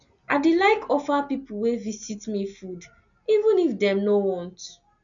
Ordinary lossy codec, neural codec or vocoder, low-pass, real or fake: none; none; 7.2 kHz; real